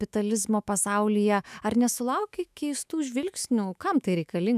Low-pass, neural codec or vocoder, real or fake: 14.4 kHz; autoencoder, 48 kHz, 128 numbers a frame, DAC-VAE, trained on Japanese speech; fake